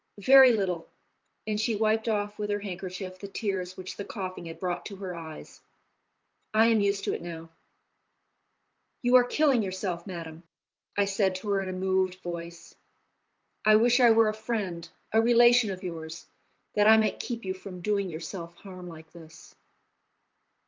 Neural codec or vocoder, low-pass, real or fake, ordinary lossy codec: vocoder, 44.1 kHz, 128 mel bands, Pupu-Vocoder; 7.2 kHz; fake; Opus, 32 kbps